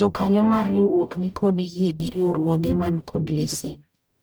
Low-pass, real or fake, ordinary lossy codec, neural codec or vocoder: none; fake; none; codec, 44.1 kHz, 0.9 kbps, DAC